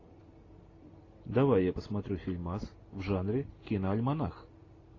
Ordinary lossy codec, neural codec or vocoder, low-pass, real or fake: AAC, 32 kbps; none; 7.2 kHz; real